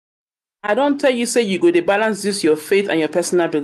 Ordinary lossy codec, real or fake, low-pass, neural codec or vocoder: none; real; 14.4 kHz; none